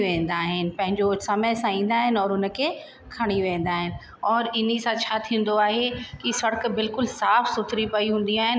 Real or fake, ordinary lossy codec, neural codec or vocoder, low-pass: real; none; none; none